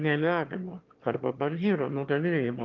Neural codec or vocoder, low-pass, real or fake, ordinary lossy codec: autoencoder, 22.05 kHz, a latent of 192 numbers a frame, VITS, trained on one speaker; 7.2 kHz; fake; Opus, 24 kbps